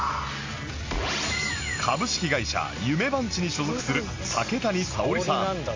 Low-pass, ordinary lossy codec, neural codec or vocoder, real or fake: 7.2 kHz; MP3, 48 kbps; none; real